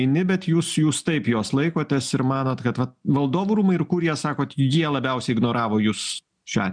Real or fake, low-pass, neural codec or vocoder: real; 9.9 kHz; none